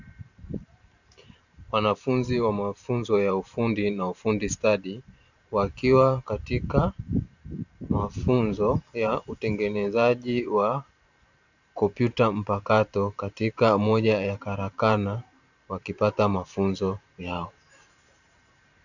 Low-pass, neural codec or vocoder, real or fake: 7.2 kHz; vocoder, 44.1 kHz, 128 mel bands every 512 samples, BigVGAN v2; fake